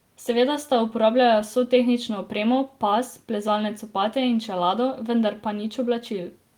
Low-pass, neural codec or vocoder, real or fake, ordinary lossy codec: 19.8 kHz; none; real; Opus, 24 kbps